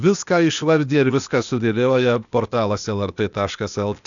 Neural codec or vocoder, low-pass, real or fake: codec, 16 kHz, 0.8 kbps, ZipCodec; 7.2 kHz; fake